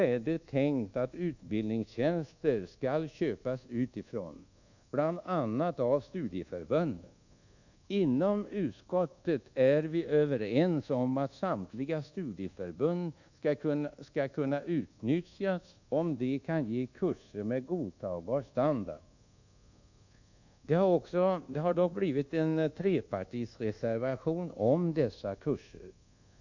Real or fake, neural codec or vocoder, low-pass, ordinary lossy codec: fake; codec, 24 kHz, 1.2 kbps, DualCodec; 7.2 kHz; none